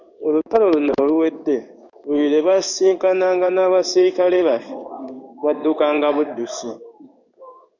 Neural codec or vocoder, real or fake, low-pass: codec, 16 kHz in and 24 kHz out, 1 kbps, XY-Tokenizer; fake; 7.2 kHz